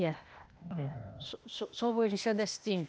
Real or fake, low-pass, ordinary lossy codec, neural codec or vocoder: fake; none; none; codec, 16 kHz, 0.8 kbps, ZipCodec